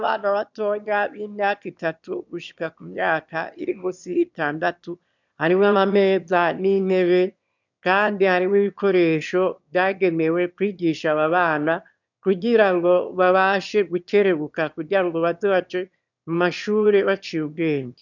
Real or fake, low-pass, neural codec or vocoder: fake; 7.2 kHz; autoencoder, 22.05 kHz, a latent of 192 numbers a frame, VITS, trained on one speaker